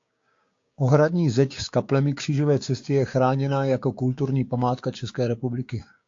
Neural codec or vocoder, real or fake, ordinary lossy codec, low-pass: codec, 16 kHz, 6 kbps, DAC; fake; AAC, 48 kbps; 7.2 kHz